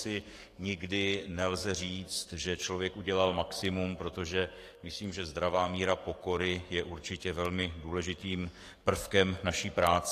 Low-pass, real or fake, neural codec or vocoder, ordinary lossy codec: 14.4 kHz; fake; codec, 44.1 kHz, 7.8 kbps, DAC; AAC, 48 kbps